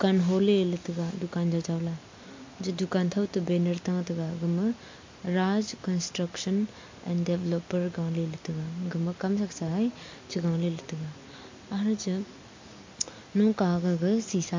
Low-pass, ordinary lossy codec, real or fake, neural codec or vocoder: 7.2 kHz; MP3, 48 kbps; real; none